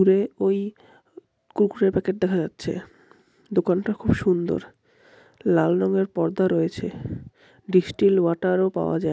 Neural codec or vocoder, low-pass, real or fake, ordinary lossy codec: none; none; real; none